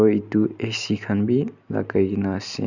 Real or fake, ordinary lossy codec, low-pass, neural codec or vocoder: real; none; 7.2 kHz; none